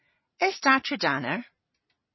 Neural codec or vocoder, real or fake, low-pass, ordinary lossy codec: vocoder, 44.1 kHz, 128 mel bands every 256 samples, BigVGAN v2; fake; 7.2 kHz; MP3, 24 kbps